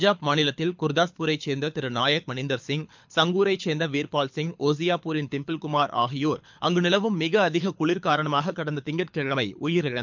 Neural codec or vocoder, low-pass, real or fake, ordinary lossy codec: codec, 24 kHz, 6 kbps, HILCodec; 7.2 kHz; fake; MP3, 64 kbps